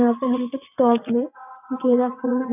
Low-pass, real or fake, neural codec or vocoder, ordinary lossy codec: 3.6 kHz; fake; vocoder, 22.05 kHz, 80 mel bands, WaveNeXt; none